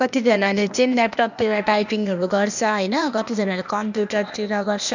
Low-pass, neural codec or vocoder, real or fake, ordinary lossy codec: 7.2 kHz; codec, 16 kHz, 0.8 kbps, ZipCodec; fake; none